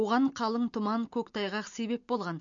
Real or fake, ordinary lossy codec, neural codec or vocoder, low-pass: real; MP3, 48 kbps; none; 7.2 kHz